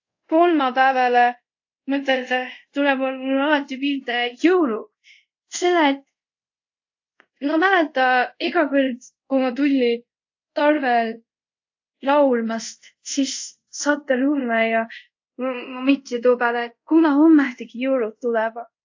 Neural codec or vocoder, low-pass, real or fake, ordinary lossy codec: codec, 24 kHz, 0.5 kbps, DualCodec; 7.2 kHz; fake; AAC, 48 kbps